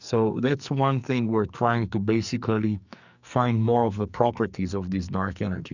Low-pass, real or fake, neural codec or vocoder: 7.2 kHz; fake; codec, 44.1 kHz, 2.6 kbps, SNAC